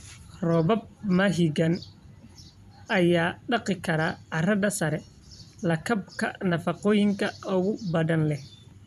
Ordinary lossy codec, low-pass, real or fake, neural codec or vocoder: none; 14.4 kHz; fake; vocoder, 44.1 kHz, 128 mel bands every 256 samples, BigVGAN v2